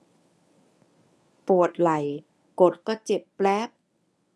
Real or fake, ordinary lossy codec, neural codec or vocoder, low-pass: fake; none; codec, 24 kHz, 0.9 kbps, WavTokenizer, medium speech release version 1; none